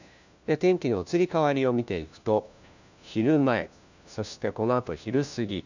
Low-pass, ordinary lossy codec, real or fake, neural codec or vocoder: 7.2 kHz; none; fake; codec, 16 kHz, 1 kbps, FunCodec, trained on LibriTTS, 50 frames a second